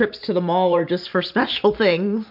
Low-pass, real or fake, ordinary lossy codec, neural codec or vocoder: 5.4 kHz; fake; MP3, 48 kbps; vocoder, 44.1 kHz, 128 mel bands every 512 samples, BigVGAN v2